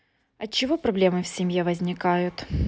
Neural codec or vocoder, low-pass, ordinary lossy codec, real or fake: none; none; none; real